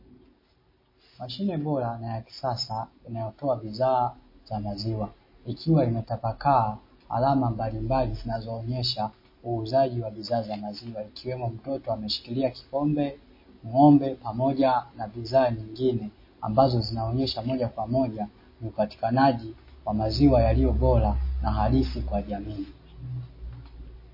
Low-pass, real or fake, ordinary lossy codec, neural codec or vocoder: 5.4 kHz; real; MP3, 24 kbps; none